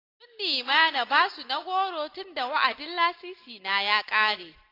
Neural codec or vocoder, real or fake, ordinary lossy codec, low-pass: none; real; AAC, 32 kbps; 5.4 kHz